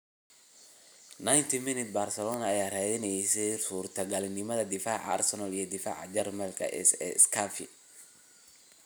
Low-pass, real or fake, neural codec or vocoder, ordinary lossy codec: none; real; none; none